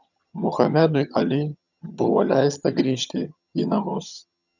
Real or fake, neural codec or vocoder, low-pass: fake; vocoder, 22.05 kHz, 80 mel bands, HiFi-GAN; 7.2 kHz